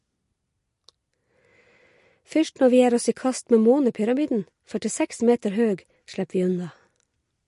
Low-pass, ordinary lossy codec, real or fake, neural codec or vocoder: 14.4 kHz; MP3, 48 kbps; real; none